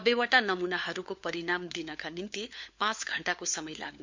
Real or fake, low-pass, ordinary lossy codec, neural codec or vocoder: fake; 7.2 kHz; MP3, 64 kbps; codec, 16 kHz, 4 kbps, FunCodec, trained on LibriTTS, 50 frames a second